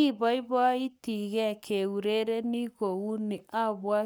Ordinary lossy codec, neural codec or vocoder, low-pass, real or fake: none; codec, 44.1 kHz, 7.8 kbps, Pupu-Codec; none; fake